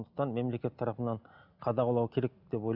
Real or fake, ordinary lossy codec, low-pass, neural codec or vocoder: real; none; 5.4 kHz; none